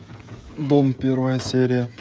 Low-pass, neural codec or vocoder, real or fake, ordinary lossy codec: none; codec, 16 kHz, 16 kbps, FreqCodec, smaller model; fake; none